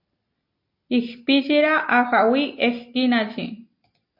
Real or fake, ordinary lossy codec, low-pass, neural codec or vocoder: real; MP3, 32 kbps; 5.4 kHz; none